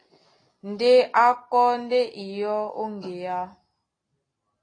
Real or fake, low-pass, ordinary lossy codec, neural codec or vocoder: real; 9.9 kHz; AAC, 32 kbps; none